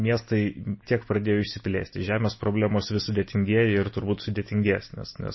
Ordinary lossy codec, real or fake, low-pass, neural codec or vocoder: MP3, 24 kbps; real; 7.2 kHz; none